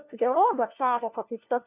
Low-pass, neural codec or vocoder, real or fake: 3.6 kHz; codec, 16 kHz, 1 kbps, FunCodec, trained on LibriTTS, 50 frames a second; fake